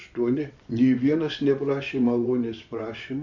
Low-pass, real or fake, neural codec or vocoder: 7.2 kHz; fake; vocoder, 24 kHz, 100 mel bands, Vocos